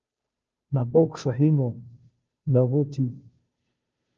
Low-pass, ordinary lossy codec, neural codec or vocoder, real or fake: 7.2 kHz; Opus, 24 kbps; codec, 16 kHz, 0.5 kbps, FunCodec, trained on Chinese and English, 25 frames a second; fake